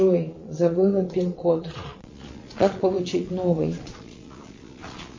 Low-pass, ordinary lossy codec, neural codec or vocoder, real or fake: 7.2 kHz; MP3, 32 kbps; vocoder, 22.05 kHz, 80 mel bands, Vocos; fake